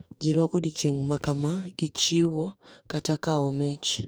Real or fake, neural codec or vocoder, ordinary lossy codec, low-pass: fake; codec, 44.1 kHz, 2.6 kbps, DAC; none; none